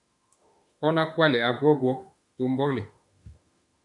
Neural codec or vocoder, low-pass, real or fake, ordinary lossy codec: autoencoder, 48 kHz, 32 numbers a frame, DAC-VAE, trained on Japanese speech; 10.8 kHz; fake; MP3, 64 kbps